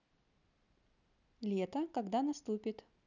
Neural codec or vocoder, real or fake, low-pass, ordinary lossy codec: none; real; 7.2 kHz; none